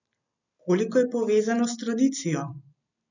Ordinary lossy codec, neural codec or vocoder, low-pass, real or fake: none; none; 7.2 kHz; real